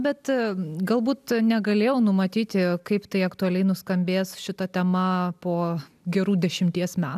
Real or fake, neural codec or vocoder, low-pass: real; none; 14.4 kHz